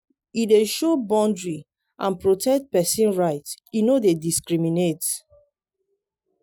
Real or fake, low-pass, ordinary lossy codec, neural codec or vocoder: real; none; none; none